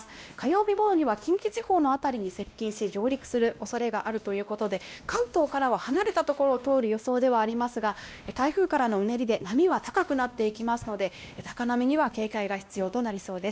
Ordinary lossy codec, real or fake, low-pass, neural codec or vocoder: none; fake; none; codec, 16 kHz, 1 kbps, X-Codec, WavLM features, trained on Multilingual LibriSpeech